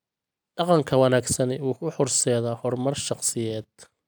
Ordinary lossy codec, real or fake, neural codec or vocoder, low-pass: none; real; none; none